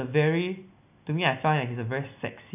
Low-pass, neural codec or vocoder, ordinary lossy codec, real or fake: 3.6 kHz; none; none; real